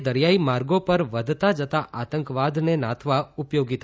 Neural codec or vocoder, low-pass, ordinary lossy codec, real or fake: none; none; none; real